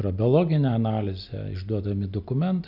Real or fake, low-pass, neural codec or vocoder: real; 5.4 kHz; none